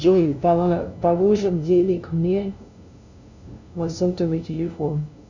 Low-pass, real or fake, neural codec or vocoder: 7.2 kHz; fake; codec, 16 kHz, 0.5 kbps, FunCodec, trained on LibriTTS, 25 frames a second